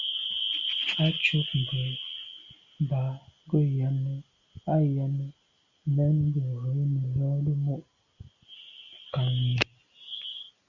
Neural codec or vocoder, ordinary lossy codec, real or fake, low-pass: none; Opus, 64 kbps; real; 7.2 kHz